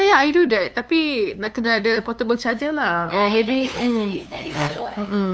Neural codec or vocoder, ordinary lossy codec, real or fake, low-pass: codec, 16 kHz, 2 kbps, FunCodec, trained on LibriTTS, 25 frames a second; none; fake; none